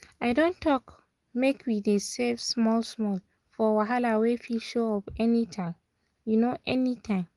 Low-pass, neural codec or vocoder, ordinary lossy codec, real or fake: 10.8 kHz; none; Opus, 24 kbps; real